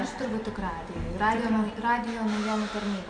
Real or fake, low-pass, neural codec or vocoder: real; 9.9 kHz; none